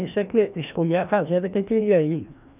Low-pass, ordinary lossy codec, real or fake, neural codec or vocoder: 3.6 kHz; none; fake; codec, 16 kHz, 1 kbps, FreqCodec, larger model